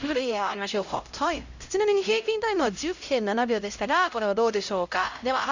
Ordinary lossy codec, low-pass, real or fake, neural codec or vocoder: Opus, 64 kbps; 7.2 kHz; fake; codec, 16 kHz, 0.5 kbps, X-Codec, HuBERT features, trained on LibriSpeech